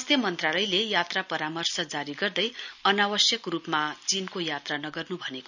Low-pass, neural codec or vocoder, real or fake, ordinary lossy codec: 7.2 kHz; none; real; none